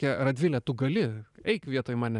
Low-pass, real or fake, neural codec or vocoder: 10.8 kHz; fake; vocoder, 44.1 kHz, 128 mel bands every 512 samples, BigVGAN v2